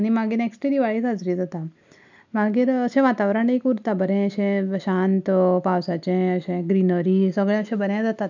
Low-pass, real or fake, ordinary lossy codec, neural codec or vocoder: 7.2 kHz; real; none; none